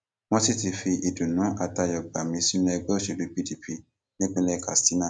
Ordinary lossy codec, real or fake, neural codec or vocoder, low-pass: none; real; none; none